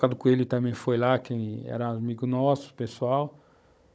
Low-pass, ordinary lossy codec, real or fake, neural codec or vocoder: none; none; fake; codec, 16 kHz, 16 kbps, FunCodec, trained on Chinese and English, 50 frames a second